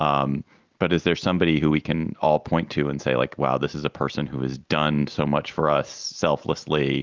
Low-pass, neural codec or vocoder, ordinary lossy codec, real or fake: 7.2 kHz; none; Opus, 32 kbps; real